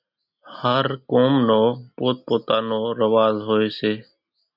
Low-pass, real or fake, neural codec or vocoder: 5.4 kHz; real; none